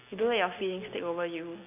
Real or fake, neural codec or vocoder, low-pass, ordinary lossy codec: real; none; 3.6 kHz; none